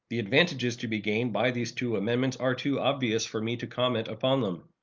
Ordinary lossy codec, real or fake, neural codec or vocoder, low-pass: Opus, 24 kbps; real; none; 7.2 kHz